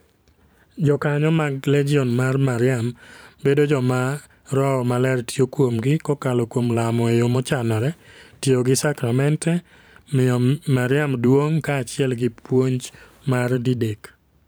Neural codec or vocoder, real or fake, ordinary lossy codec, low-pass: vocoder, 44.1 kHz, 128 mel bands every 512 samples, BigVGAN v2; fake; none; none